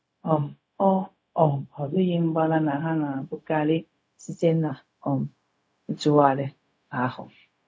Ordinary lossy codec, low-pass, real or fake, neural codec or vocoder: none; none; fake; codec, 16 kHz, 0.4 kbps, LongCat-Audio-Codec